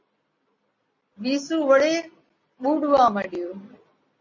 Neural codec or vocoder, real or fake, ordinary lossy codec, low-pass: none; real; MP3, 32 kbps; 7.2 kHz